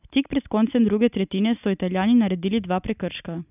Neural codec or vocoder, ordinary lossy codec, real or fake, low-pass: none; none; real; 3.6 kHz